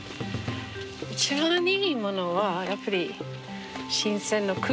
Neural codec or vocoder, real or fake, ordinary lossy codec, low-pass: none; real; none; none